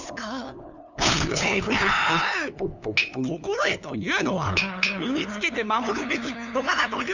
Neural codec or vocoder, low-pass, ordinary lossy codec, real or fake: codec, 16 kHz, 2 kbps, FunCodec, trained on LibriTTS, 25 frames a second; 7.2 kHz; none; fake